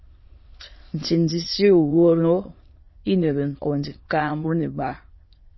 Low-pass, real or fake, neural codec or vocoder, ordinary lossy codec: 7.2 kHz; fake; autoencoder, 22.05 kHz, a latent of 192 numbers a frame, VITS, trained on many speakers; MP3, 24 kbps